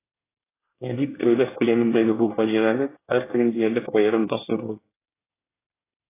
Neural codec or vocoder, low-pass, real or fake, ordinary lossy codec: codec, 24 kHz, 1 kbps, SNAC; 3.6 kHz; fake; AAC, 16 kbps